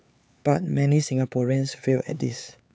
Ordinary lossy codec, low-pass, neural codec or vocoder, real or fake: none; none; codec, 16 kHz, 4 kbps, X-Codec, HuBERT features, trained on LibriSpeech; fake